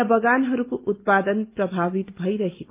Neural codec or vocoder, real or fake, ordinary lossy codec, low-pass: none; real; Opus, 24 kbps; 3.6 kHz